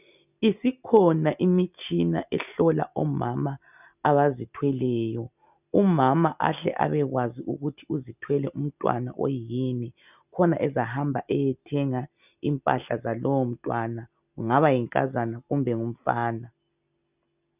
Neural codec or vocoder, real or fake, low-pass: none; real; 3.6 kHz